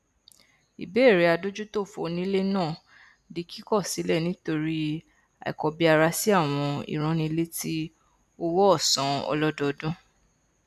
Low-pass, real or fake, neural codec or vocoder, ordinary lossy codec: 14.4 kHz; real; none; none